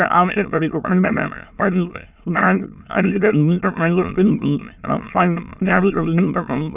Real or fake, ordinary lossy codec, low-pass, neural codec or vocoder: fake; none; 3.6 kHz; autoencoder, 22.05 kHz, a latent of 192 numbers a frame, VITS, trained on many speakers